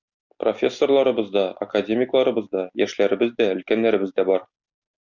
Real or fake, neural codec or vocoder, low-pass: real; none; 7.2 kHz